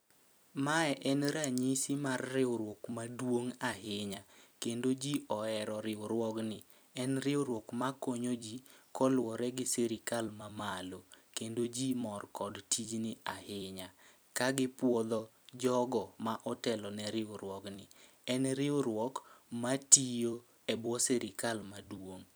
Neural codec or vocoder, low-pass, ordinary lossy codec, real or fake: vocoder, 44.1 kHz, 128 mel bands every 256 samples, BigVGAN v2; none; none; fake